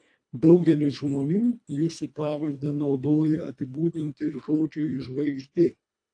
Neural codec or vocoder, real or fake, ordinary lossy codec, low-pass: codec, 24 kHz, 1.5 kbps, HILCodec; fake; AAC, 64 kbps; 9.9 kHz